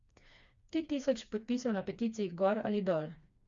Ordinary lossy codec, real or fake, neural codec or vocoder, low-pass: Opus, 64 kbps; fake; codec, 16 kHz, 2 kbps, FreqCodec, smaller model; 7.2 kHz